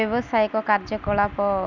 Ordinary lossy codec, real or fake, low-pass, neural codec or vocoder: none; real; 7.2 kHz; none